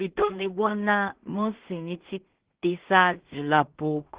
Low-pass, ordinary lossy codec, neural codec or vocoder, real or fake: 3.6 kHz; Opus, 16 kbps; codec, 16 kHz in and 24 kHz out, 0.4 kbps, LongCat-Audio-Codec, two codebook decoder; fake